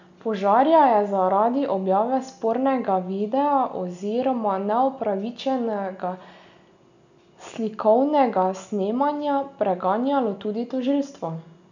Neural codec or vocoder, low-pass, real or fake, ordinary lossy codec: none; 7.2 kHz; real; none